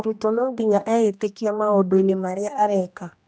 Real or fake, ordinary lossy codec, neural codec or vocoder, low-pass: fake; none; codec, 16 kHz, 1 kbps, X-Codec, HuBERT features, trained on general audio; none